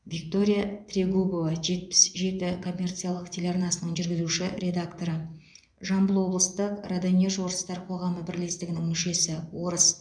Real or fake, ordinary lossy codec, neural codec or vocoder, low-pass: fake; none; vocoder, 24 kHz, 100 mel bands, Vocos; 9.9 kHz